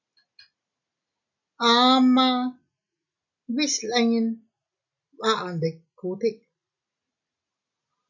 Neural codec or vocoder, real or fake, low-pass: none; real; 7.2 kHz